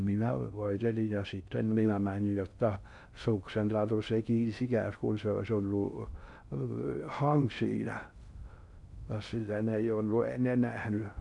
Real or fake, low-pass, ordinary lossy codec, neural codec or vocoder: fake; 10.8 kHz; none; codec, 16 kHz in and 24 kHz out, 0.6 kbps, FocalCodec, streaming, 4096 codes